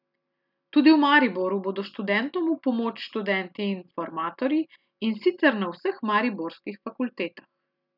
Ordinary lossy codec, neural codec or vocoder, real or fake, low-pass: none; none; real; 5.4 kHz